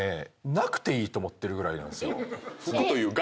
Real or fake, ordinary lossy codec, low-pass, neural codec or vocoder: real; none; none; none